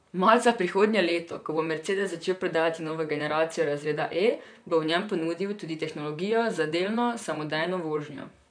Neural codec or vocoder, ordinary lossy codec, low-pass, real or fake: vocoder, 44.1 kHz, 128 mel bands, Pupu-Vocoder; none; 9.9 kHz; fake